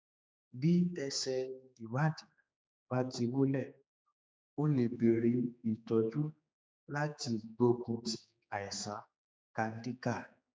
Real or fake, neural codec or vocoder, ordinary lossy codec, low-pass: fake; codec, 16 kHz, 4 kbps, X-Codec, HuBERT features, trained on general audio; none; none